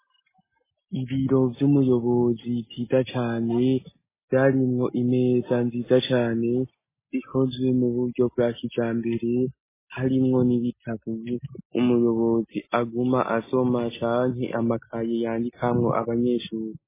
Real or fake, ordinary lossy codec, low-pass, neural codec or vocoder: real; MP3, 16 kbps; 3.6 kHz; none